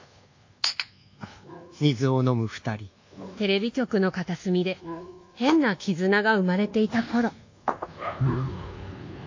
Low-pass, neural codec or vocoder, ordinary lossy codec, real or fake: 7.2 kHz; codec, 24 kHz, 1.2 kbps, DualCodec; AAC, 48 kbps; fake